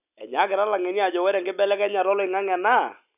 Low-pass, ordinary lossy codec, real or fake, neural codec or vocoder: 3.6 kHz; none; real; none